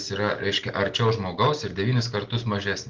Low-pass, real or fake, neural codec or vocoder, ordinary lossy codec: 7.2 kHz; real; none; Opus, 16 kbps